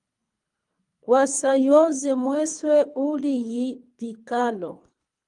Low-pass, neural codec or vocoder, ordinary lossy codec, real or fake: 10.8 kHz; codec, 24 kHz, 3 kbps, HILCodec; Opus, 32 kbps; fake